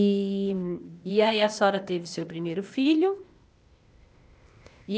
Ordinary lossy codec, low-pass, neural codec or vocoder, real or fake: none; none; codec, 16 kHz, 0.8 kbps, ZipCodec; fake